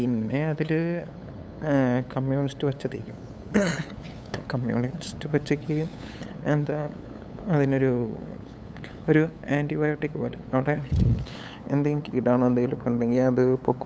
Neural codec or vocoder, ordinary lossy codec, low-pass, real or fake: codec, 16 kHz, 8 kbps, FunCodec, trained on LibriTTS, 25 frames a second; none; none; fake